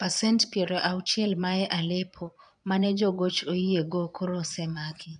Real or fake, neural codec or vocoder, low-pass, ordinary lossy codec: fake; vocoder, 22.05 kHz, 80 mel bands, Vocos; 9.9 kHz; none